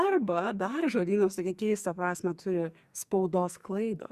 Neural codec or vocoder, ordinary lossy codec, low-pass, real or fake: codec, 44.1 kHz, 2.6 kbps, SNAC; Opus, 64 kbps; 14.4 kHz; fake